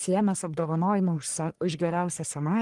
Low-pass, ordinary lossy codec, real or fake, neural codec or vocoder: 10.8 kHz; Opus, 24 kbps; fake; codec, 44.1 kHz, 1.7 kbps, Pupu-Codec